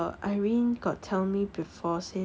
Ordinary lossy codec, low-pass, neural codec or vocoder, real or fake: none; none; none; real